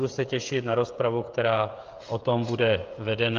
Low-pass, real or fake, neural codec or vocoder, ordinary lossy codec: 7.2 kHz; fake; codec, 16 kHz, 16 kbps, FreqCodec, smaller model; Opus, 24 kbps